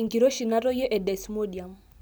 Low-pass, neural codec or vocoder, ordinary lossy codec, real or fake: none; none; none; real